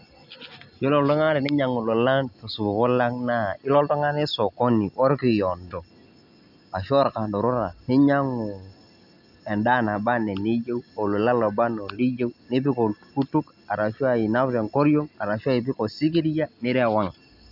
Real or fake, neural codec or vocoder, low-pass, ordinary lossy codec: real; none; 5.4 kHz; none